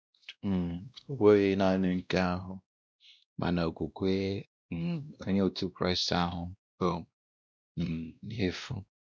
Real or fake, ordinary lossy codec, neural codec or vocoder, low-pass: fake; none; codec, 16 kHz, 1 kbps, X-Codec, WavLM features, trained on Multilingual LibriSpeech; none